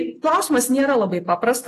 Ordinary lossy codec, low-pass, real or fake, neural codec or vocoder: AAC, 48 kbps; 14.4 kHz; real; none